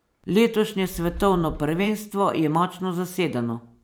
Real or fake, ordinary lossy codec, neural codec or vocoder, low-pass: fake; none; vocoder, 44.1 kHz, 128 mel bands every 512 samples, BigVGAN v2; none